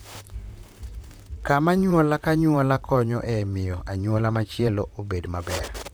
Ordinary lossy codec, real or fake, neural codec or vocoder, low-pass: none; fake; vocoder, 44.1 kHz, 128 mel bands, Pupu-Vocoder; none